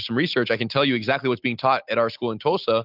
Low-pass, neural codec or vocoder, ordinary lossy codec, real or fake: 5.4 kHz; none; AAC, 48 kbps; real